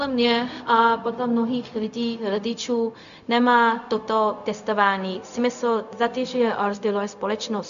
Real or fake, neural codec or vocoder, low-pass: fake; codec, 16 kHz, 0.4 kbps, LongCat-Audio-Codec; 7.2 kHz